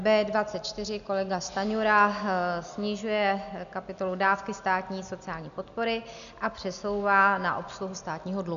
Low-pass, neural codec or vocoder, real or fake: 7.2 kHz; none; real